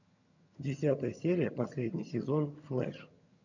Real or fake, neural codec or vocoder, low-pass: fake; vocoder, 22.05 kHz, 80 mel bands, HiFi-GAN; 7.2 kHz